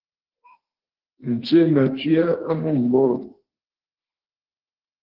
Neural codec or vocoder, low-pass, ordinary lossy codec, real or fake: autoencoder, 48 kHz, 32 numbers a frame, DAC-VAE, trained on Japanese speech; 5.4 kHz; Opus, 16 kbps; fake